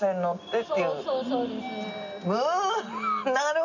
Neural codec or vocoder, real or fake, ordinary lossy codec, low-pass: none; real; none; 7.2 kHz